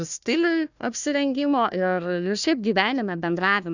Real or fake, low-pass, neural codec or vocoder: fake; 7.2 kHz; codec, 16 kHz, 1 kbps, FunCodec, trained on Chinese and English, 50 frames a second